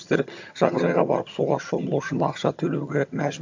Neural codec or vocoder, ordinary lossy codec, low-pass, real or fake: vocoder, 22.05 kHz, 80 mel bands, HiFi-GAN; AAC, 48 kbps; 7.2 kHz; fake